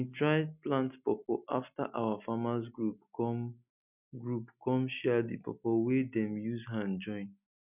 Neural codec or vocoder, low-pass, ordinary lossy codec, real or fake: none; 3.6 kHz; none; real